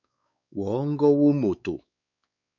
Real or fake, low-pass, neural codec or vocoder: fake; 7.2 kHz; codec, 16 kHz, 4 kbps, X-Codec, WavLM features, trained on Multilingual LibriSpeech